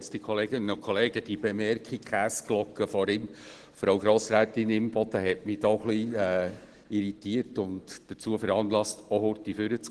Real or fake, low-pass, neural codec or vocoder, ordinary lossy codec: real; 10.8 kHz; none; Opus, 16 kbps